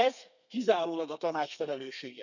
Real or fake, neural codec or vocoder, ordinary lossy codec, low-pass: fake; codec, 32 kHz, 1.9 kbps, SNAC; none; 7.2 kHz